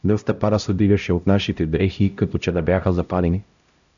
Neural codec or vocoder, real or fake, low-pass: codec, 16 kHz, 0.5 kbps, X-Codec, HuBERT features, trained on LibriSpeech; fake; 7.2 kHz